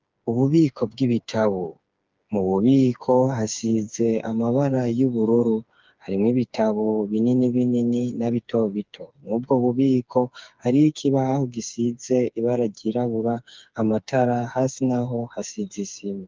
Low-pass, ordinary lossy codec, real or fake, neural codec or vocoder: 7.2 kHz; Opus, 24 kbps; fake; codec, 16 kHz, 4 kbps, FreqCodec, smaller model